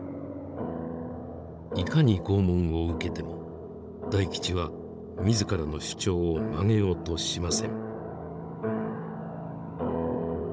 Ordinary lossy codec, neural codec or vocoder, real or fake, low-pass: none; codec, 16 kHz, 16 kbps, FunCodec, trained on Chinese and English, 50 frames a second; fake; none